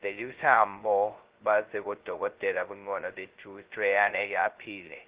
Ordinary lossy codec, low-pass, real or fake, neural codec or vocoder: Opus, 16 kbps; 3.6 kHz; fake; codec, 16 kHz, 0.2 kbps, FocalCodec